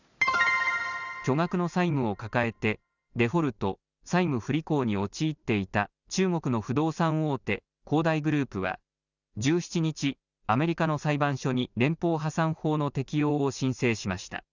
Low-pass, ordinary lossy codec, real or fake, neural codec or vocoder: 7.2 kHz; none; real; none